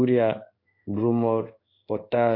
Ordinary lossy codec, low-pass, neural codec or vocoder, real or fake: AAC, 32 kbps; 5.4 kHz; codec, 16 kHz in and 24 kHz out, 1 kbps, XY-Tokenizer; fake